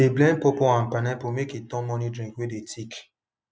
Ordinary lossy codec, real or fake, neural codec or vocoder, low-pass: none; real; none; none